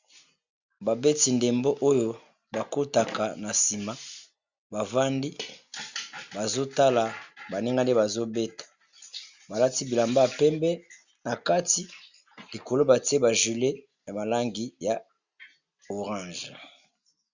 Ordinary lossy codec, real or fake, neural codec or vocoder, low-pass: Opus, 64 kbps; real; none; 7.2 kHz